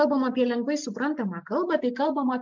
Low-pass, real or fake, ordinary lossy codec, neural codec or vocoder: 7.2 kHz; real; MP3, 64 kbps; none